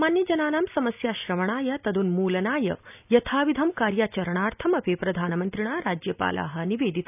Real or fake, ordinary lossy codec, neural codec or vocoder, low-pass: real; none; none; 3.6 kHz